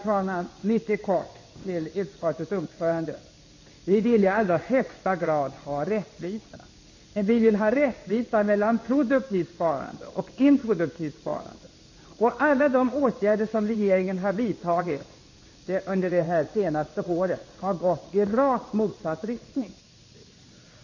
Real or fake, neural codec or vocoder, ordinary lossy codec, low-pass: fake; codec, 16 kHz in and 24 kHz out, 1 kbps, XY-Tokenizer; MP3, 32 kbps; 7.2 kHz